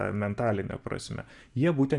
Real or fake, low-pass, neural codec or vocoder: real; 10.8 kHz; none